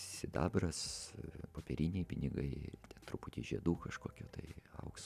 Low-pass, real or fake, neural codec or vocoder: 14.4 kHz; real; none